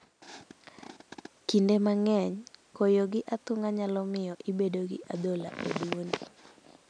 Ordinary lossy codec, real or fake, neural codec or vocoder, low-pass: none; real; none; 9.9 kHz